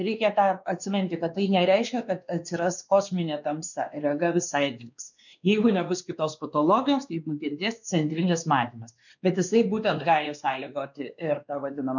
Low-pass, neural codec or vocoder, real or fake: 7.2 kHz; codec, 16 kHz, 2 kbps, X-Codec, WavLM features, trained on Multilingual LibriSpeech; fake